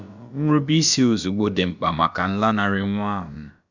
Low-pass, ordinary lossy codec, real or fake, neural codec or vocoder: 7.2 kHz; none; fake; codec, 16 kHz, about 1 kbps, DyCAST, with the encoder's durations